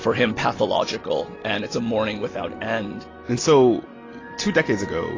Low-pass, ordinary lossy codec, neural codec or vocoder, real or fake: 7.2 kHz; AAC, 32 kbps; none; real